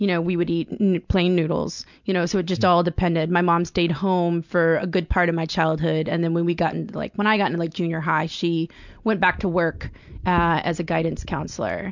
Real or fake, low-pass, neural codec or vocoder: real; 7.2 kHz; none